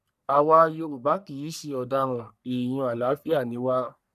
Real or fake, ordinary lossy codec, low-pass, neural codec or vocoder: fake; none; 14.4 kHz; codec, 44.1 kHz, 3.4 kbps, Pupu-Codec